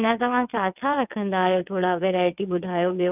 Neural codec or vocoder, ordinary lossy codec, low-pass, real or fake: vocoder, 22.05 kHz, 80 mel bands, WaveNeXt; none; 3.6 kHz; fake